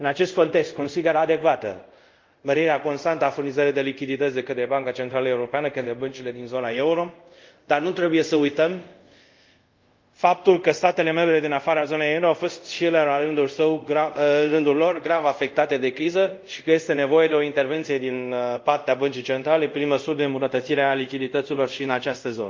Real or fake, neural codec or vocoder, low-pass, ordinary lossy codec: fake; codec, 24 kHz, 0.5 kbps, DualCodec; 7.2 kHz; Opus, 24 kbps